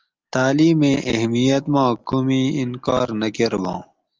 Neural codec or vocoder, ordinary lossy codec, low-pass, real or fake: none; Opus, 32 kbps; 7.2 kHz; real